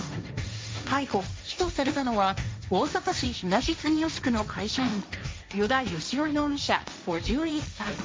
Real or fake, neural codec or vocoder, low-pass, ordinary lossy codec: fake; codec, 16 kHz, 1.1 kbps, Voila-Tokenizer; none; none